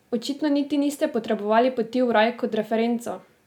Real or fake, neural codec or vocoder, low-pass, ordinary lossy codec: real; none; 19.8 kHz; none